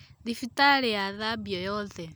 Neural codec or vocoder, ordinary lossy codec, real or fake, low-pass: none; none; real; none